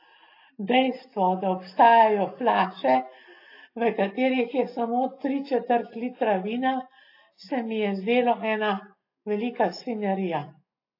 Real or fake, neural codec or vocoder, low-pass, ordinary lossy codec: real; none; 5.4 kHz; AAC, 32 kbps